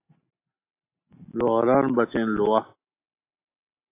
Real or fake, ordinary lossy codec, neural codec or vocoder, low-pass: real; AAC, 24 kbps; none; 3.6 kHz